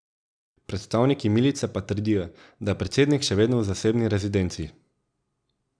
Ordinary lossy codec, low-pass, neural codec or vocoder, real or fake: none; 9.9 kHz; none; real